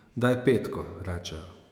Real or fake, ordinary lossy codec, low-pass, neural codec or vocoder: fake; none; 19.8 kHz; autoencoder, 48 kHz, 128 numbers a frame, DAC-VAE, trained on Japanese speech